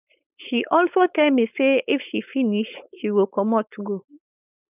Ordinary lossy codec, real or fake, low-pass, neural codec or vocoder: none; fake; 3.6 kHz; codec, 16 kHz, 4.8 kbps, FACodec